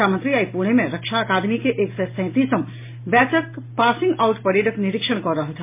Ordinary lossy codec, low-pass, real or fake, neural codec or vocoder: MP3, 24 kbps; 3.6 kHz; real; none